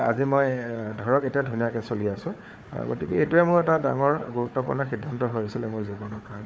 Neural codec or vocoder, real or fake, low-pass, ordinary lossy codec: codec, 16 kHz, 4 kbps, FunCodec, trained on Chinese and English, 50 frames a second; fake; none; none